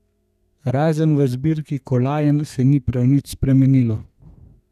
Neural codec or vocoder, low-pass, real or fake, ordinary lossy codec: codec, 32 kHz, 1.9 kbps, SNAC; 14.4 kHz; fake; none